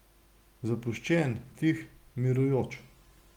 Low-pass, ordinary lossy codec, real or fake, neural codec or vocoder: 19.8 kHz; Opus, 24 kbps; real; none